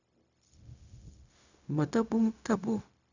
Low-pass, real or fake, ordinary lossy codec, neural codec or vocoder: 7.2 kHz; fake; none; codec, 16 kHz, 0.4 kbps, LongCat-Audio-Codec